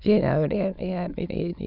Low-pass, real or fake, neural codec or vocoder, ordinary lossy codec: 5.4 kHz; fake; autoencoder, 22.05 kHz, a latent of 192 numbers a frame, VITS, trained on many speakers; none